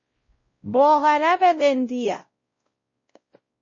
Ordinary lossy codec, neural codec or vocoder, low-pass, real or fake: MP3, 32 kbps; codec, 16 kHz, 0.5 kbps, X-Codec, WavLM features, trained on Multilingual LibriSpeech; 7.2 kHz; fake